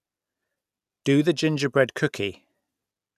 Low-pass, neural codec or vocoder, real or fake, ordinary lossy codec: 14.4 kHz; none; real; none